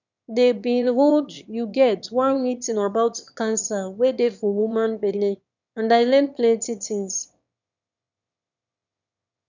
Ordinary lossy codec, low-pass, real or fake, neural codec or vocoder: none; 7.2 kHz; fake; autoencoder, 22.05 kHz, a latent of 192 numbers a frame, VITS, trained on one speaker